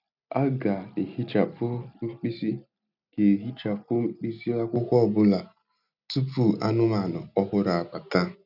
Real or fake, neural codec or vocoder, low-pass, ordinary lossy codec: real; none; 5.4 kHz; none